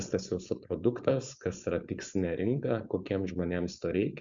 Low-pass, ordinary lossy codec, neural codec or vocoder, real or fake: 7.2 kHz; Opus, 64 kbps; codec, 16 kHz, 4.8 kbps, FACodec; fake